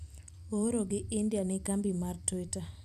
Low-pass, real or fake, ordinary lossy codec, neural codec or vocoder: 14.4 kHz; fake; none; vocoder, 44.1 kHz, 128 mel bands every 256 samples, BigVGAN v2